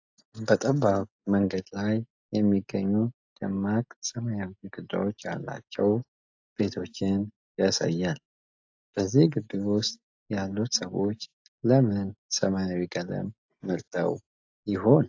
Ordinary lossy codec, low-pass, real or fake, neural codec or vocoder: AAC, 48 kbps; 7.2 kHz; real; none